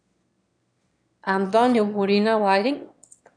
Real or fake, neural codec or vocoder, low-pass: fake; autoencoder, 22.05 kHz, a latent of 192 numbers a frame, VITS, trained on one speaker; 9.9 kHz